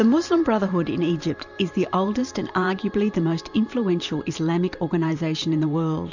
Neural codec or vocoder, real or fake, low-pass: vocoder, 44.1 kHz, 128 mel bands every 512 samples, BigVGAN v2; fake; 7.2 kHz